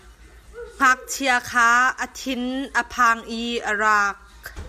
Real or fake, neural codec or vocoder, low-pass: real; none; 14.4 kHz